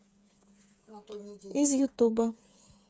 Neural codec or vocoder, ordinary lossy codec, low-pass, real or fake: codec, 16 kHz, 8 kbps, FreqCodec, smaller model; none; none; fake